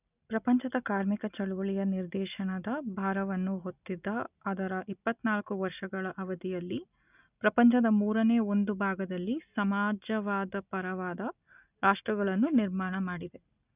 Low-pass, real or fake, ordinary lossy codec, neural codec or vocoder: 3.6 kHz; real; none; none